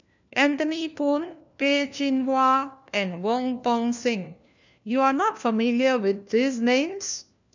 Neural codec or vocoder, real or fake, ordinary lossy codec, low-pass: codec, 16 kHz, 1 kbps, FunCodec, trained on LibriTTS, 50 frames a second; fake; none; 7.2 kHz